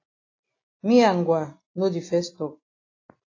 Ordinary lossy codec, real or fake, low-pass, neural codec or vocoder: AAC, 32 kbps; real; 7.2 kHz; none